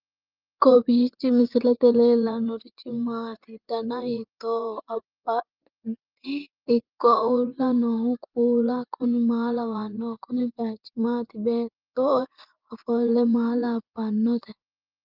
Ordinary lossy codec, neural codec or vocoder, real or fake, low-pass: Opus, 24 kbps; vocoder, 24 kHz, 100 mel bands, Vocos; fake; 5.4 kHz